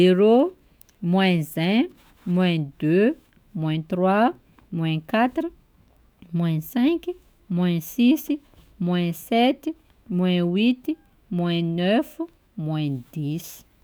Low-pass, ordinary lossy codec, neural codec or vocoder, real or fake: none; none; autoencoder, 48 kHz, 128 numbers a frame, DAC-VAE, trained on Japanese speech; fake